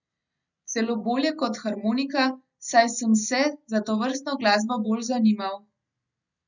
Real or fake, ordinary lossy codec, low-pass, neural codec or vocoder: real; none; 7.2 kHz; none